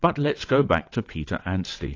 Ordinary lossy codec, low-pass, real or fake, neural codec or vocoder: AAC, 32 kbps; 7.2 kHz; fake; vocoder, 22.05 kHz, 80 mel bands, WaveNeXt